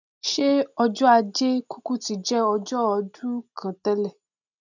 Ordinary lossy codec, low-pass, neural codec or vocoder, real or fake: none; 7.2 kHz; none; real